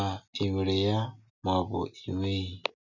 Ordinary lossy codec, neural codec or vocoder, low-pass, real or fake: none; none; 7.2 kHz; real